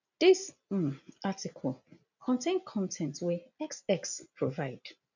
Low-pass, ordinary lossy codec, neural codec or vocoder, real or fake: 7.2 kHz; none; none; real